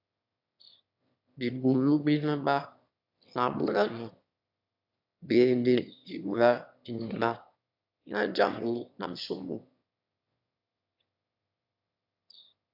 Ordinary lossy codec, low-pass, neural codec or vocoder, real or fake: AAC, 48 kbps; 5.4 kHz; autoencoder, 22.05 kHz, a latent of 192 numbers a frame, VITS, trained on one speaker; fake